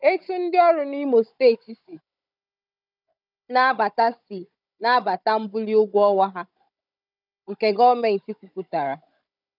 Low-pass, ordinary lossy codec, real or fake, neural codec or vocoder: 5.4 kHz; none; fake; codec, 16 kHz, 16 kbps, FunCodec, trained on Chinese and English, 50 frames a second